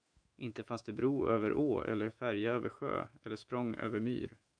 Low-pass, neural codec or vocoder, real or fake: 9.9 kHz; autoencoder, 48 kHz, 128 numbers a frame, DAC-VAE, trained on Japanese speech; fake